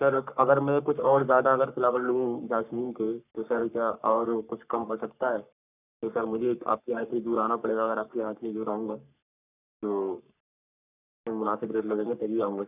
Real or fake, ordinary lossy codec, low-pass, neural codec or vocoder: fake; none; 3.6 kHz; codec, 44.1 kHz, 3.4 kbps, Pupu-Codec